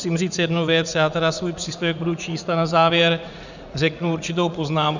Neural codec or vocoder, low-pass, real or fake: vocoder, 44.1 kHz, 80 mel bands, Vocos; 7.2 kHz; fake